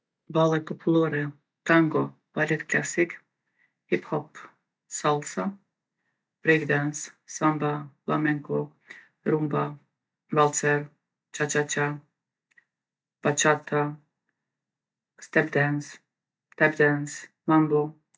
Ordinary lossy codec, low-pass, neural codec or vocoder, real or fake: none; none; none; real